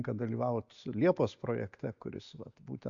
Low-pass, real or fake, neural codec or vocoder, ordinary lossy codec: 7.2 kHz; real; none; AAC, 64 kbps